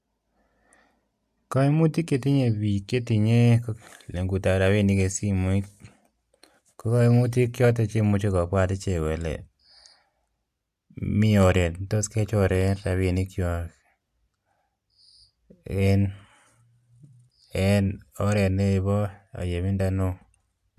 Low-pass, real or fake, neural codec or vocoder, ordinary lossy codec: 14.4 kHz; real; none; AAC, 96 kbps